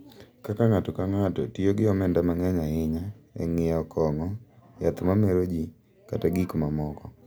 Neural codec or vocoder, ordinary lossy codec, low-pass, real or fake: none; none; none; real